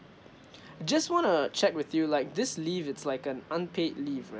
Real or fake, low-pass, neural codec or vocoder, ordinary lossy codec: real; none; none; none